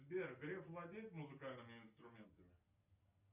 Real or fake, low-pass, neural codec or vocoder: real; 3.6 kHz; none